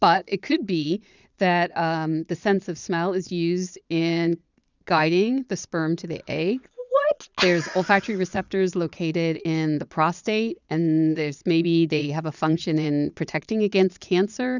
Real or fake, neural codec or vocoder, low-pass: fake; vocoder, 22.05 kHz, 80 mel bands, Vocos; 7.2 kHz